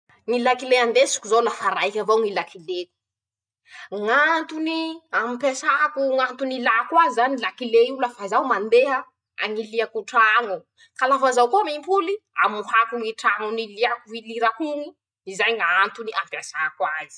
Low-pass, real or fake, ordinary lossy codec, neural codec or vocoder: 9.9 kHz; real; none; none